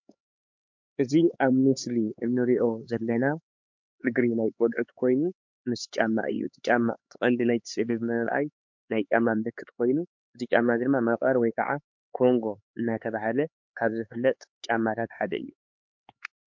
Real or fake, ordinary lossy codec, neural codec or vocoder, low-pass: fake; MP3, 48 kbps; codec, 16 kHz, 4 kbps, X-Codec, HuBERT features, trained on LibriSpeech; 7.2 kHz